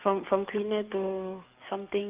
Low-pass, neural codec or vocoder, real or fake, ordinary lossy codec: 3.6 kHz; codec, 44.1 kHz, 7.8 kbps, DAC; fake; Opus, 24 kbps